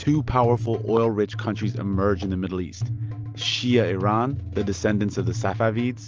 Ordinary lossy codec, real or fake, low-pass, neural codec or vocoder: Opus, 24 kbps; real; 7.2 kHz; none